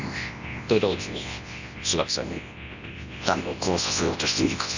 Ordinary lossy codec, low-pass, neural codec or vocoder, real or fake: Opus, 64 kbps; 7.2 kHz; codec, 24 kHz, 0.9 kbps, WavTokenizer, large speech release; fake